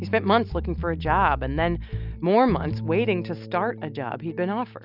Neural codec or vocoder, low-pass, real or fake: none; 5.4 kHz; real